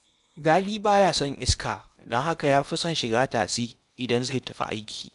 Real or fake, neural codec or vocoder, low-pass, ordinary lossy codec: fake; codec, 16 kHz in and 24 kHz out, 0.8 kbps, FocalCodec, streaming, 65536 codes; 10.8 kHz; none